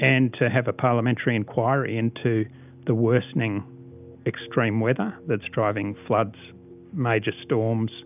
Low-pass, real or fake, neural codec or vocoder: 3.6 kHz; real; none